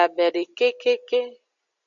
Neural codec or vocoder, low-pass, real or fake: none; 7.2 kHz; real